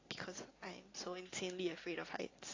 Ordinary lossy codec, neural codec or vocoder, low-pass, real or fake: AAC, 32 kbps; none; 7.2 kHz; real